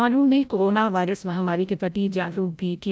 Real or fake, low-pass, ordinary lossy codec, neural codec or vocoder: fake; none; none; codec, 16 kHz, 0.5 kbps, FreqCodec, larger model